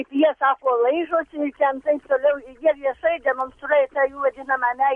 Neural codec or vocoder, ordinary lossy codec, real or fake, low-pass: none; MP3, 48 kbps; real; 10.8 kHz